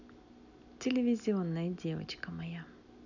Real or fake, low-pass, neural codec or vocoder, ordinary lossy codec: real; 7.2 kHz; none; none